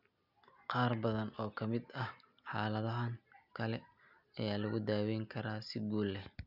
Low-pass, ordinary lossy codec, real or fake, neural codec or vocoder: 5.4 kHz; none; real; none